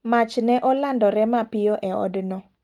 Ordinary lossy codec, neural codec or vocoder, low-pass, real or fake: Opus, 32 kbps; none; 19.8 kHz; real